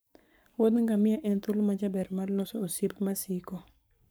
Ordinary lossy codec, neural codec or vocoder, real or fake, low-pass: none; codec, 44.1 kHz, 7.8 kbps, Pupu-Codec; fake; none